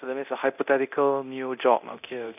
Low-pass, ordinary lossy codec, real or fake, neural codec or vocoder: 3.6 kHz; none; fake; codec, 24 kHz, 0.9 kbps, DualCodec